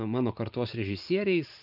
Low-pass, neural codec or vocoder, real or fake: 5.4 kHz; vocoder, 44.1 kHz, 128 mel bands every 512 samples, BigVGAN v2; fake